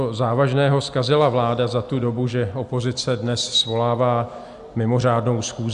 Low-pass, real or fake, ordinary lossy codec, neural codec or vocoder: 10.8 kHz; real; Opus, 64 kbps; none